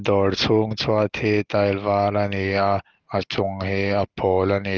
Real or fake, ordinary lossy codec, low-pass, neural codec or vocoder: real; Opus, 16 kbps; 7.2 kHz; none